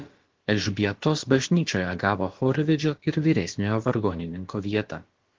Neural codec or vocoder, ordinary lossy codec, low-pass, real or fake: codec, 16 kHz, about 1 kbps, DyCAST, with the encoder's durations; Opus, 16 kbps; 7.2 kHz; fake